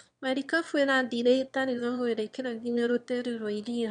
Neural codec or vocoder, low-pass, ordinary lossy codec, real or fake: autoencoder, 22.05 kHz, a latent of 192 numbers a frame, VITS, trained on one speaker; 9.9 kHz; MP3, 64 kbps; fake